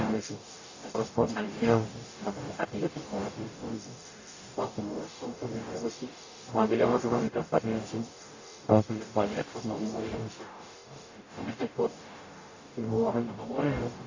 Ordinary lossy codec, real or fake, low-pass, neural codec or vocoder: AAC, 48 kbps; fake; 7.2 kHz; codec, 44.1 kHz, 0.9 kbps, DAC